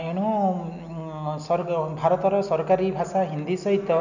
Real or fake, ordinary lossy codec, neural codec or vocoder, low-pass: real; none; none; 7.2 kHz